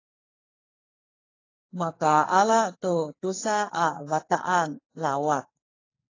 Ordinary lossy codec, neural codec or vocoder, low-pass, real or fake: AAC, 32 kbps; codec, 44.1 kHz, 2.6 kbps, SNAC; 7.2 kHz; fake